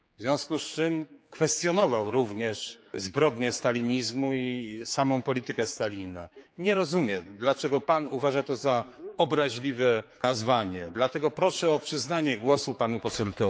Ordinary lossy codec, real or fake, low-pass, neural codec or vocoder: none; fake; none; codec, 16 kHz, 4 kbps, X-Codec, HuBERT features, trained on general audio